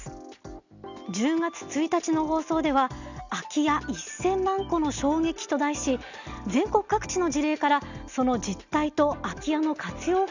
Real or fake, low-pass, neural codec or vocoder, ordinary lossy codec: real; 7.2 kHz; none; none